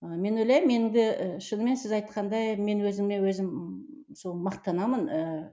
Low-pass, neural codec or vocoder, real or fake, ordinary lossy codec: none; none; real; none